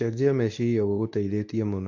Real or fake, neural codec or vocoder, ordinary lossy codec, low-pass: fake; codec, 24 kHz, 0.9 kbps, WavTokenizer, medium speech release version 2; none; 7.2 kHz